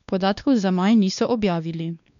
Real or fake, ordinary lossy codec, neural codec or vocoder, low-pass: fake; none; codec, 16 kHz, 2 kbps, X-Codec, WavLM features, trained on Multilingual LibriSpeech; 7.2 kHz